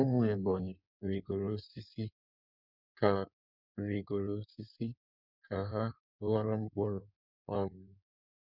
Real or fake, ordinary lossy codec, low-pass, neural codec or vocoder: fake; none; 5.4 kHz; codec, 16 kHz in and 24 kHz out, 1.1 kbps, FireRedTTS-2 codec